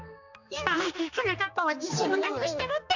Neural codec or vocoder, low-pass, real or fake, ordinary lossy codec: codec, 16 kHz, 2 kbps, X-Codec, HuBERT features, trained on general audio; 7.2 kHz; fake; none